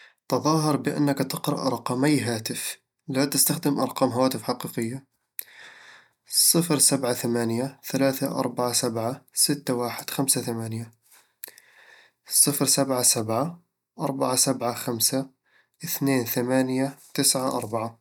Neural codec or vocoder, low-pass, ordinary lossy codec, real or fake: none; 19.8 kHz; none; real